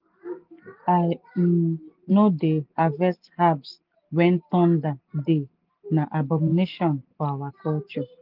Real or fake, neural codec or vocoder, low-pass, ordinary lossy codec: real; none; 5.4 kHz; Opus, 32 kbps